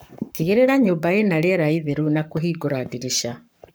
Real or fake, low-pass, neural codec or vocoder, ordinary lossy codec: fake; none; codec, 44.1 kHz, 7.8 kbps, Pupu-Codec; none